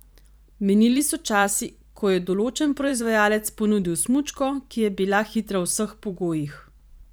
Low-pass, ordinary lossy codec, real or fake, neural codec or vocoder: none; none; fake; vocoder, 44.1 kHz, 128 mel bands every 512 samples, BigVGAN v2